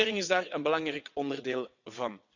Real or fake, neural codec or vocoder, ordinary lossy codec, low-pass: fake; vocoder, 22.05 kHz, 80 mel bands, WaveNeXt; none; 7.2 kHz